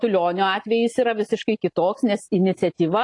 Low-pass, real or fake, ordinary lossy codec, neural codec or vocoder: 10.8 kHz; real; AAC, 48 kbps; none